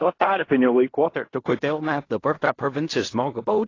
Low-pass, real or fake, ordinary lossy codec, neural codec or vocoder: 7.2 kHz; fake; AAC, 32 kbps; codec, 16 kHz in and 24 kHz out, 0.4 kbps, LongCat-Audio-Codec, fine tuned four codebook decoder